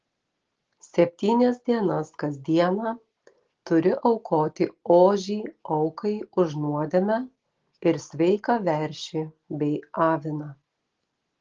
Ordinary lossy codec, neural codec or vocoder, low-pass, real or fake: Opus, 16 kbps; none; 7.2 kHz; real